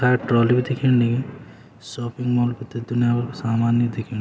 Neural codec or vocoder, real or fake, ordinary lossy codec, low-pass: none; real; none; none